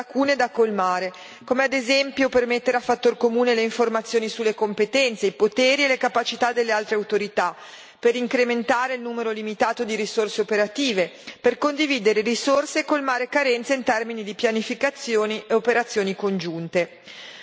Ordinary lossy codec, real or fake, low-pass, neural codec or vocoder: none; real; none; none